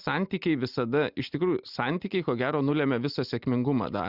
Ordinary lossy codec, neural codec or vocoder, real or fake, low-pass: Opus, 64 kbps; none; real; 5.4 kHz